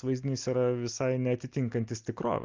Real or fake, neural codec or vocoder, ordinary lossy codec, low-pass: real; none; Opus, 24 kbps; 7.2 kHz